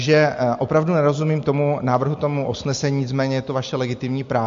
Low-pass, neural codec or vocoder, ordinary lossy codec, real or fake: 7.2 kHz; none; MP3, 48 kbps; real